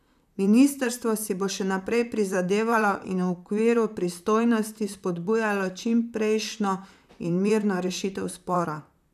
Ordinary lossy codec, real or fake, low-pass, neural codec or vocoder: none; fake; 14.4 kHz; vocoder, 44.1 kHz, 128 mel bands, Pupu-Vocoder